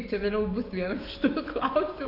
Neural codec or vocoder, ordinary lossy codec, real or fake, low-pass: codec, 44.1 kHz, 7.8 kbps, DAC; AAC, 48 kbps; fake; 5.4 kHz